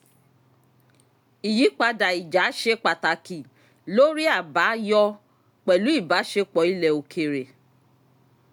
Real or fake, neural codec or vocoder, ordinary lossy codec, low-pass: real; none; MP3, 96 kbps; 19.8 kHz